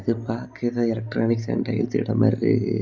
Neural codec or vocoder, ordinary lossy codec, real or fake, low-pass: none; none; real; 7.2 kHz